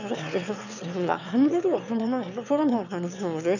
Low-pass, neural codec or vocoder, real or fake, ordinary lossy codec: 7.2 kHz; autoencoder, 22.05 kHz, a latent of 192 numbers a frame, VITS, trained on one speaker; fake; none